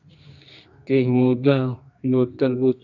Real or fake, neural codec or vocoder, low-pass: fake; codec, 24 kHz, 0.9 kbps, WavTokenizer, medium music audio release; 7.2 kHz